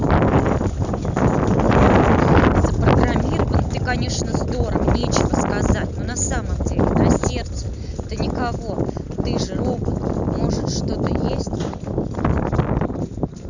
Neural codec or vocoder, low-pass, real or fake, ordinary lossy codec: none; 7.2 kHz; real; none